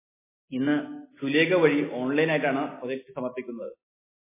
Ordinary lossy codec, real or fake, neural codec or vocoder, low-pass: MP3, 16 kbps; real; none; 3.6 kHz